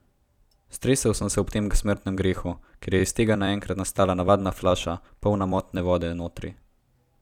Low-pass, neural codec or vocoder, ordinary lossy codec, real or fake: 19.8 kHz; vocoder, 44.1 kHz, 128 mel bands every 256 samples, BigVGAN v2; none; fake